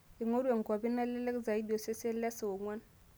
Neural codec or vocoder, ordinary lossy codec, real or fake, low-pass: none; none; real; none